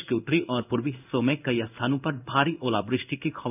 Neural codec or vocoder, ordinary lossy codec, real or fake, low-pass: codec, 16 kHz in and 24 kHz out, 1 kbps, XY-Tokenizer; none; fake; 3.6 kHz